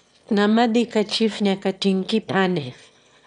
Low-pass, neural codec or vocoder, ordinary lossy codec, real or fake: 9.9 kHz; autoencoder, 22.05 kHz, a latent of 192 numbers a frame, VITS, trained on one speaker; none; fake